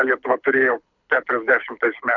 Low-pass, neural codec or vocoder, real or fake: 7.2 kHz; codec, 24 kHz, 6 kbps, HILCodec; fake